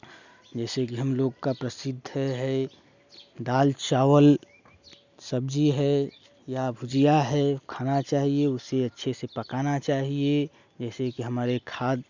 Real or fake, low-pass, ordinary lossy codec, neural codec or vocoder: real; 7.2 kHz; none; none